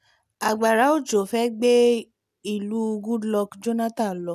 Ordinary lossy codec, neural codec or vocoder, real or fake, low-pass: none; none; real; 14.4 kHz